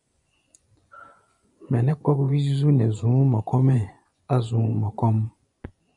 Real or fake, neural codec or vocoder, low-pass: fake; vocoder, 24 kHz, 100 mel bands, Vocos; 10.8 kHz